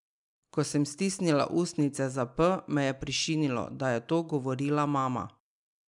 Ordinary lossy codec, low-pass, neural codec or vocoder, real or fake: MP3, 96 kbps; 10.8 kHz; none; real